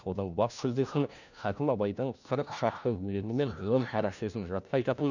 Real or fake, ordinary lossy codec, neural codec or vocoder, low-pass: fake; none; codec, 16 kHz, 1 kbps, FunCodec, trained on LibriTTS, 50 frames a second; 7.2 kHz